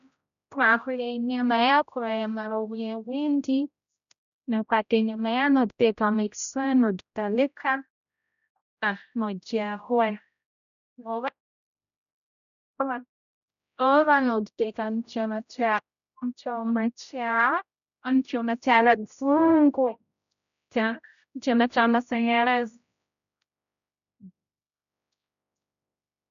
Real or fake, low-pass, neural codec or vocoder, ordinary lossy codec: fake; 7.2 kHz; codec, 16 kHz, 0.5 kbps, X-Codec, HuBERT features, trained on general audio; none